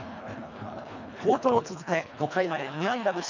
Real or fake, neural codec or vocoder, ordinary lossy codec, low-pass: fake; codec, 24 kHz, 1.5 kbps, HILCodec; none; 7.2 kHz